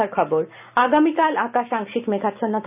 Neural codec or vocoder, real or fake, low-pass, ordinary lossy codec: none; real; 3.6 kHz; none